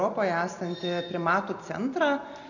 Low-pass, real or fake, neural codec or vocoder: 7.2 kHz; real; none